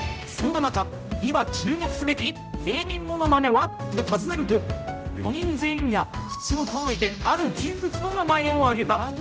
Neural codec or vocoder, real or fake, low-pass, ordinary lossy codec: codec, 16 kHz, 0.5 kbps, X-Codec, HuBERT features, trained on general audio; fake; none; none